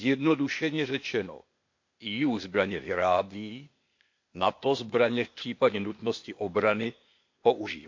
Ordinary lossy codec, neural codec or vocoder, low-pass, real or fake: MP3, 48 kbps; codec, 16 kHz, 0.8 kbps, ZipCodec; 7.2 kHz; fake